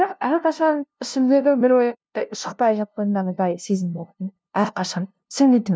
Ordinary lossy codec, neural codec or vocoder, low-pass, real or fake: none; codec, 16 kHz, 0.5 kbps, FunCodec, trained on LibriTTS, 25 frames a second; none; fake